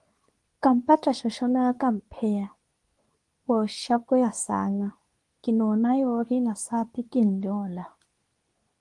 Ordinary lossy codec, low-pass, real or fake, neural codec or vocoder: Opus, 24 kbps; 10.8 kHz; fake; codec, 24 kHz, 0.9 kbps, WavTokenizer, medium speech release version 2